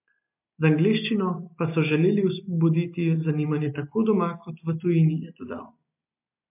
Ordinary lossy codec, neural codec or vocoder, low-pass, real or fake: MP3, 32 kbps; none; 3.6 kHz; real